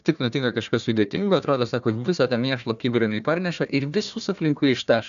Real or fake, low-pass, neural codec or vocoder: fake; 7.2 kHz; codec, 16 kHz, 1 kbps, FunCodec, trained on Chinese and English, 50 frames a second